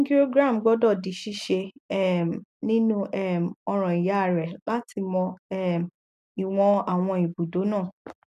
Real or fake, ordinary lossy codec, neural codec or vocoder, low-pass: real; none; none; 14.4 kHz